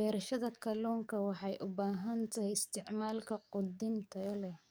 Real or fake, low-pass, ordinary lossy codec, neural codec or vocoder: fake; none; none; codec, 44.1 kHz, 7.8 kbps, DAC